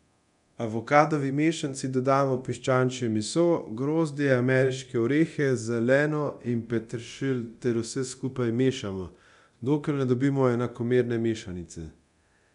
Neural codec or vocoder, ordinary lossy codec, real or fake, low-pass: codec, 24 kHz, 0.9 kbps, DualCodec; none; fake; 10.8 kHz